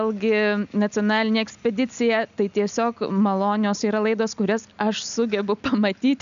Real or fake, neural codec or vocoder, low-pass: real; none; 7.2 kHz